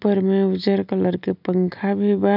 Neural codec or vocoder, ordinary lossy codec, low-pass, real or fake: none; none; 5.4 kHz; real